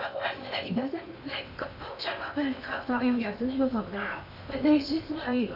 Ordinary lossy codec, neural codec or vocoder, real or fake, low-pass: none; codec, 16 kHz in and 24 kHz out, 0.8 kbps, FocalCodec, streaming, 65536 codes; fake; 5.4 kHz